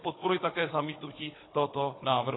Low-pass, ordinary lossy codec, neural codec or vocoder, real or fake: 7.2 kHz; AAC, 16 kbps; codec, 16 kHz, 16 kbps, FunCodec, trained on Chinese and English, 50 frames a second; fake